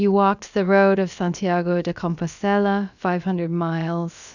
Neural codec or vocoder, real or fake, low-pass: codec, 16 kHz, about 1 kbps, DyCAST, with the encoder's durations; fake; 7.2 kHz